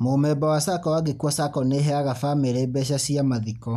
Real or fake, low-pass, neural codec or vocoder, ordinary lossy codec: real; 14.4 kHz; none; none